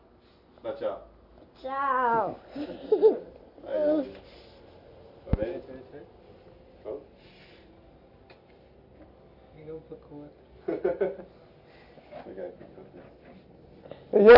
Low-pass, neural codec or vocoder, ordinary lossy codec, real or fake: 5.4 kHz; none; none; real